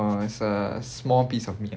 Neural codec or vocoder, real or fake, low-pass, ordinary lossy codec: none; real; none; none